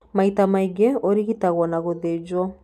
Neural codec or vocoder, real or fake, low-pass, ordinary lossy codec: none; real; 14.4 kHz; none